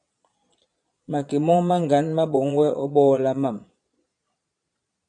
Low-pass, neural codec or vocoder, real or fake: 9.9 kHz; vocoder, 22.05 kHz, 80 mel bands, Vocos; fake